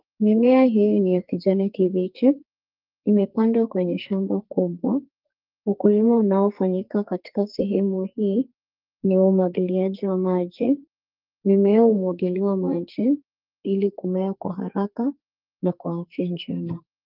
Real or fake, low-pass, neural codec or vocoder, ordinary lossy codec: fake; 5.4 kHz; codec, 44.1 kHz, 2.6 kbps, SNAC; Opus, 32 kbps